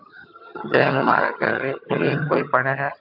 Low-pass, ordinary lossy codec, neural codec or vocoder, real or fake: 5.4 kHz; AAC, 48 kbps; vocoder, 22.05 kHz, 80 mel bands, HiFi-GAN; fake